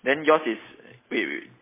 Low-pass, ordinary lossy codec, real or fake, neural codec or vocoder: 3.6 kHz; MP3, 16 kbps; real; none